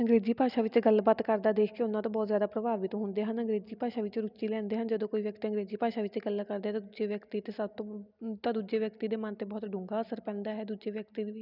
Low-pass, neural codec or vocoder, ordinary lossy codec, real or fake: 5.4 kHz; none; none; real